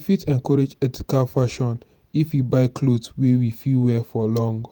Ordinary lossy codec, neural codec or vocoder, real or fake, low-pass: none; vocoder, 48 kHz, 128 mel bands, Vocos; fake; none